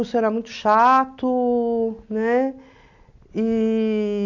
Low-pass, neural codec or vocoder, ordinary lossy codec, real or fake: 7.2 kHz; none; none; real